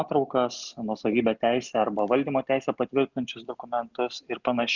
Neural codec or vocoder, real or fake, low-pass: none; real; 7.2 kHz